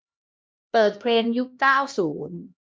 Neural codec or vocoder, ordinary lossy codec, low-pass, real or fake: codec, 16 kHz, 1 kbps, X-Codec, HuBERT features, trained on LibriSpeech; none; none; fake